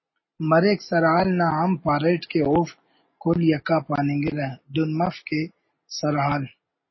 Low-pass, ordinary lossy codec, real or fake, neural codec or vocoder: 7.2 kHz; MP3, 24 kbps; real; none